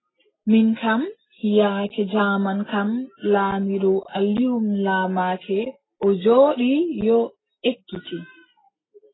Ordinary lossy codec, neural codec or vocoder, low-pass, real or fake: AAC, 16 kbps; none; 7.2 kHz; real